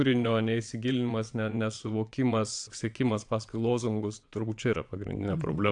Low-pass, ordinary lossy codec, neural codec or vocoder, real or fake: 9.9 kHz; AAC, 64 kbps; vocoder, 22.05 kHz, 80 mel bands, WaveNeXt; fake